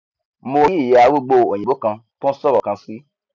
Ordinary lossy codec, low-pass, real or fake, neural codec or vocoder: none; 7.2 kHz; real; none